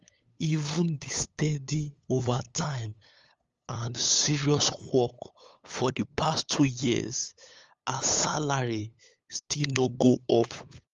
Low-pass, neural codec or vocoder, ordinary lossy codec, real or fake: 7.2 kHz; codec, 16 kHz, 8 kbps, FunCodec, trained on LibriTTS, 25 frames a second; Opus, 32 kbps; fake